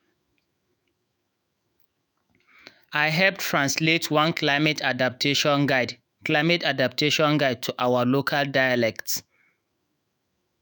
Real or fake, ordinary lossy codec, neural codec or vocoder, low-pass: fake; none; autoencoder, 48 kHz, 128 numbers a frame, DAC-VAE, trained on Japanese speech; none